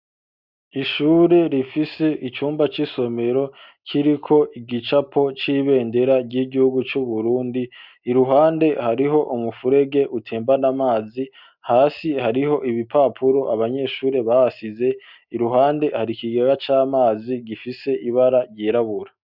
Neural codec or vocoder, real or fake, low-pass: none; real; 5.4 kHz